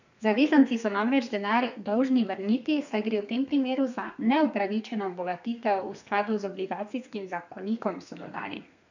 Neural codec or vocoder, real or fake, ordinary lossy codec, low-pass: codec, 32 kHz, 1.9 kbps, SNAC; fake; none; 7.2 kHz